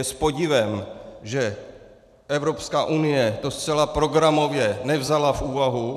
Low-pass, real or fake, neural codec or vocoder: 14.4 kHz; fake; vocoder, 44.1 kHz, 128 mel bands every 512 samples, BigVGAN v2